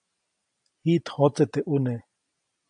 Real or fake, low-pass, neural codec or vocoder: real; 9.9 kHz; none